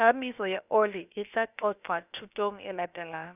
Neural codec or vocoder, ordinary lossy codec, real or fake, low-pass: codec, 16 kHz, about 1 kbps, DyCAST, with the encoder's durations; none; fake; 3.6 kHz